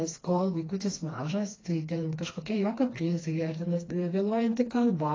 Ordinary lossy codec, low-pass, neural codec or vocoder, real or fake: AAC, 32 kbps; 7.2 kHz; codec, 16 kHz, 2 kbps, FreqCodec, smaller model; fake